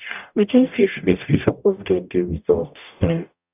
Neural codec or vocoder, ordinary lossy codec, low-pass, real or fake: codec, 44.1 kHz, 0.9 kbps, DAC; none; 3.6 kHz; fake